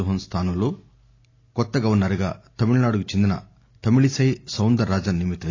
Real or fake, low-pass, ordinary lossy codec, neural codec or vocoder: real; 7.2 kHz; AAC, 32 kbps; none